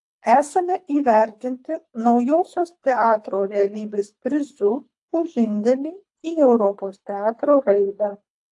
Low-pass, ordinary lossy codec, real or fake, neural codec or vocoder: 10.8 kHz; AAC, 64 kbps; fake; codec, 24 kHz, 3 kbps, HILCodec